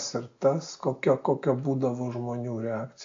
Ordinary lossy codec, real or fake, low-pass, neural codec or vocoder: AAC, 48 kbps; real; 7.2 kHz; none